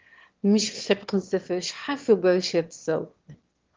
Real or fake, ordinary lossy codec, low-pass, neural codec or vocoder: fake; Opus, 16 kbps; 7.2 kHz; autoencoder, 22.05 kHz, a latent of 192 numbers a frame, VITS, trained on one speaker